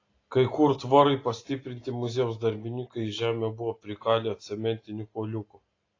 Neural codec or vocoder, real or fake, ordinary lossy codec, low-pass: none; real; AAC, 32 kbps; 7.2 kHz